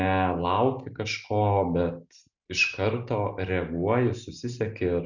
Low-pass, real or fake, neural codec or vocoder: 7.2 kHz; real; none